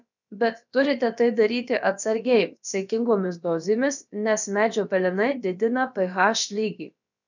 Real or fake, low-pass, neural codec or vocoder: fake; 7.2 kHz; codec, 16 kHz, about 1 kbps, DyCAST, with the encoder's durations